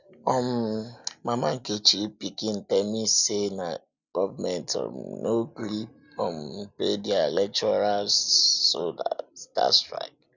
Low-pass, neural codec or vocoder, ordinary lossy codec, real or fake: 7.2 kHz; none; none; real